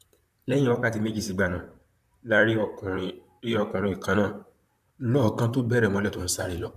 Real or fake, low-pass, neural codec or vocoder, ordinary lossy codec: fake; 14.4 kHz; vocoder, 44.1 kHz, 128 mel bands, Pupu-Vocoder; none